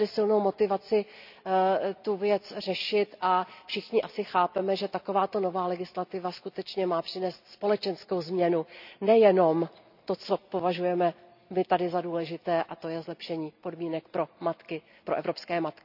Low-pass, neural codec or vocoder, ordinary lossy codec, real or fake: 5.4 kHz; none; none; real